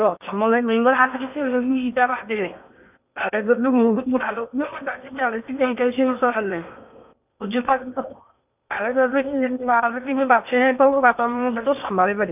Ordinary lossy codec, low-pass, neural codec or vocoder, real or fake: none; 3.6 kHz; codec, 16 kHz in and 24 kHz out, 0.8 kbps, FocalCodec, streaming, 65536 codes; fake